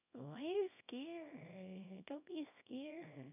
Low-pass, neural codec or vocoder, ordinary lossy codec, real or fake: 3.6 kHz; codec, 24 kHz, 0.9 kbps, WavTokenizer, small release; none; fake